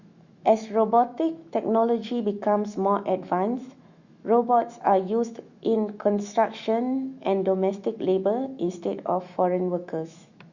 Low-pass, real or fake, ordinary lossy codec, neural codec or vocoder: 7.2 kHz; fake; Opus, 64 kbps; autoencoder, 48 kHz, 128 numbers a frame, DAC-VAE, trained on Japanese speech